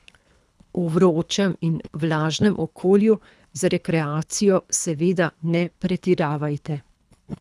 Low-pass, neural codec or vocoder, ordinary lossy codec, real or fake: none; codec, 24 kHz, 3 kbps, HILCodec; none; fake